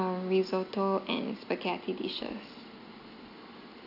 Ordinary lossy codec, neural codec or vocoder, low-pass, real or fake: none; none; 5.4 kHz; real